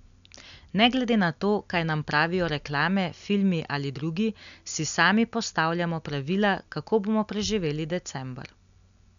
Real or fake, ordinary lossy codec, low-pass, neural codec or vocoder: real; none; 7.2 kHz; none